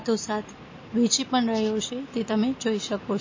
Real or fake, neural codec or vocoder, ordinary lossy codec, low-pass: real; none; MP3, 32 kbps; 7.2 kHz